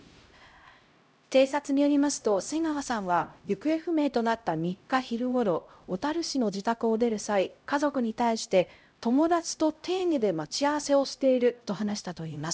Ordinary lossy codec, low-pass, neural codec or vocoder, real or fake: none; none; codec, 16 kHz, 0.5 kbps, X-Codec, HuBERT features, trained on LibriSpeech; fake